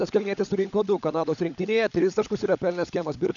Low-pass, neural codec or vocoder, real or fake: 7.2 kHz; codec, 16 kHz, 16 kbps, FunCodec, trained on LibriTTS, 50 frames a second; fake